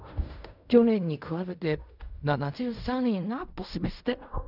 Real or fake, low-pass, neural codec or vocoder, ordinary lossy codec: fake; 5.4 kHz; codec, 16 kHz in and 24 kHz out, 0.4 kbps, LongCat-Audio-Codec, fine tuned four codebook decoder; none